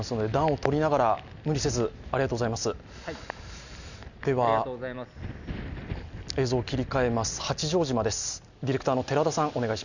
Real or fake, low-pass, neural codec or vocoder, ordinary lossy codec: real; 7.2 kHz; none; none